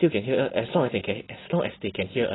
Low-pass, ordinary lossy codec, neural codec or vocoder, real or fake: 7.2 kHz; AAC, 16 kbps; none; real